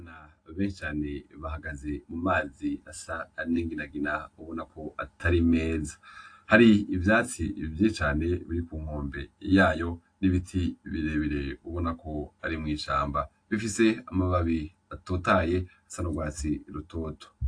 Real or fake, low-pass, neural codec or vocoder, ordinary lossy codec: real; 9.9 kHz; none; AAC, 48 kbps